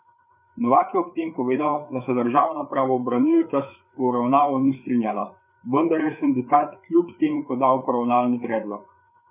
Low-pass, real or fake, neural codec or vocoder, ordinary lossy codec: 3.6 kHz; fake; codec, 16 kHz, 4 kbps, FreqCodec, larger model; none